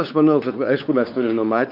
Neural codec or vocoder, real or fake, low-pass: codec, 16 kHz, 4 kbps, X-Codec, WavLM features, trained on Multilingual LibriSpeech; fake; 5.4 kHz